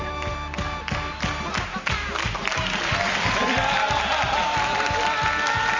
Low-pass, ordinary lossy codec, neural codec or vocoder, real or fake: 7.2 kHz; Opus, 32 kbps; none; real